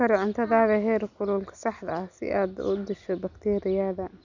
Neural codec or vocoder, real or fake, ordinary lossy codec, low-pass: none; real; none; 7.2 kHz